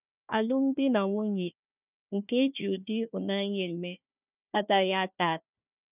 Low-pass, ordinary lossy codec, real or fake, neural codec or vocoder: 3.6 kHz; none; fake; codec, 16 kHz, 1 kbps, FunCodec, trained on Chinese and English, 50 frames a second